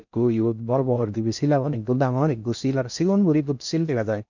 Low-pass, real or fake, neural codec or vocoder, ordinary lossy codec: 7.2 kHz; fake; codec, 16 kHz in and 24 kHz out, 0.6 kbps, FocalCodec, streaming, 4096 codes; none